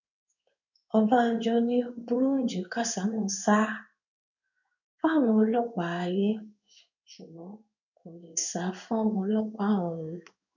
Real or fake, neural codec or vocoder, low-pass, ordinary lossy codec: fake; codec, 16 kHz in and 24 kHz out, 1 kbps, XY-Tokenizer; 7.2 kHz; none